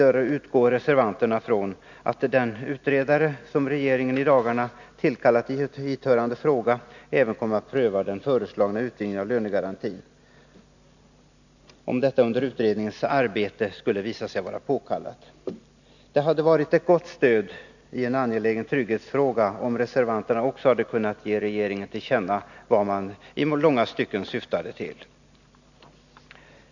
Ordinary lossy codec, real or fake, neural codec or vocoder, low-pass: none; real; none; 7.2 kHz